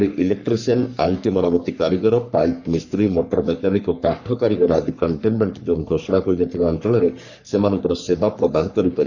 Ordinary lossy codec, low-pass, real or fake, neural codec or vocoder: none; 7.2 kHz; fake; codec, 44.1 kHz, 3.4 kbps, Pupu-Codec